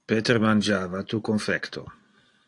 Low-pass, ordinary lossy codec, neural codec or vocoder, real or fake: 10.8 kHz; AAC, 48 kbps; none; real